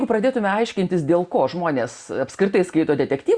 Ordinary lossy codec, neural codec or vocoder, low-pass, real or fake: Opus, 64 kbps; none; 9.9 kHz; real